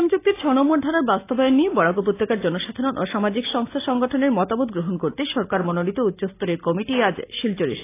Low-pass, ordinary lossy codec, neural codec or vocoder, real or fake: 3.6 kHz; AAC, 24 kbps; none; real